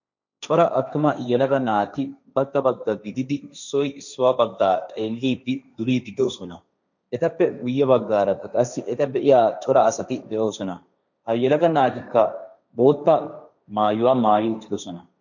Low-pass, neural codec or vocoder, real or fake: 7.2 kHz; codec, 16 kHz, 1.1 kbps, Voila-Tokenizer; fake